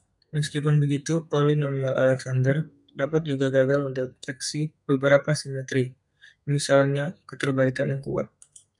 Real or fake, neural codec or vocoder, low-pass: fake; codec, 32 kHz, 1.9 kbps, SNAC; 10.8 kHz